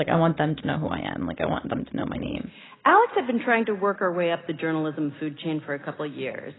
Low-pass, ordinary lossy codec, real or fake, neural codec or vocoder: 7.2 kHz; AAC, 16 kbps; real; none